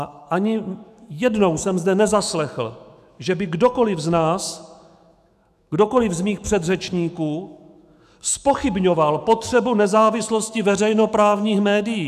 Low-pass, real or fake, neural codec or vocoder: 14.4 kHz; fake; autoencoder, 48 kHz, 128 numbers a frame, DAC-VAE, trained on Japanese speech